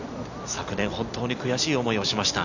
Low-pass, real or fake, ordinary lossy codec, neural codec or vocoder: 7.2 kHz; real; none; none